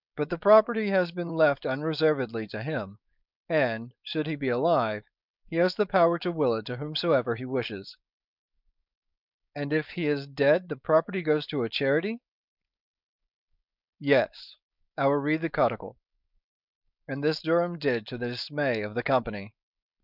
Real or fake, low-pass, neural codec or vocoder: fake; 5.4 kHz; codec, 16 kHz, 4.8 kbps, FACodec